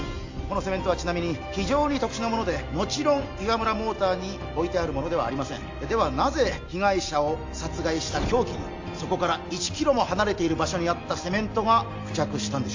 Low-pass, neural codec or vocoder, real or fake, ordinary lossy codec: 7.2 kHz; none; real; AAC, 48 kbps